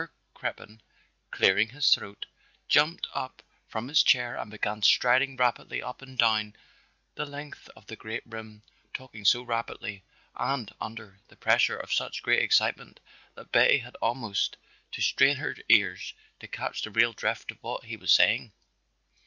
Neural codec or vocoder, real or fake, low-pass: none; real; 7.2 kHz